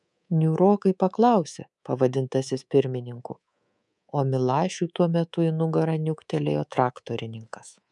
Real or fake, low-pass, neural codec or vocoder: fake; 10.8 kHz; codec, 24 kHz, 3.1 kbps, DualCodec